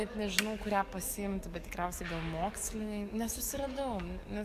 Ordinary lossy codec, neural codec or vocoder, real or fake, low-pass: Opus, 64 kbps; codec, 44.1 kHz, 7.8 kbps, DAC; fake; 14.4 kHz